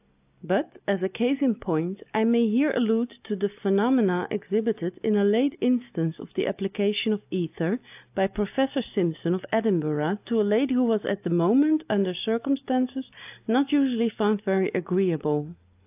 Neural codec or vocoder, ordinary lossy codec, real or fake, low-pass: none; AAC, 32 kbps; real; 3.6 kHz